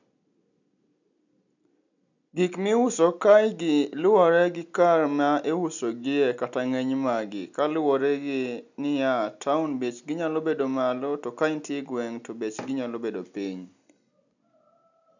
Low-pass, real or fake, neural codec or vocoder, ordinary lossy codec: 7.2 kHz; real; none; none